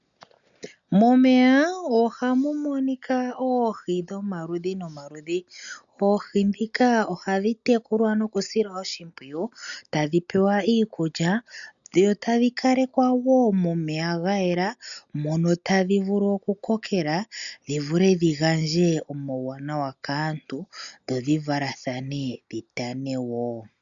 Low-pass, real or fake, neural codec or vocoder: 7.2 kHz; real; none